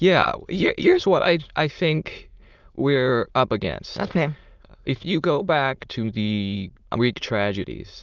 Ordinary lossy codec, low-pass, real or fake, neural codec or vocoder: Opus, 24 kbps; 7.2 kHz; fake; autoencoder, 22.05 kHz, a latent of 192 numbers a frame, VITS, trained on many speakers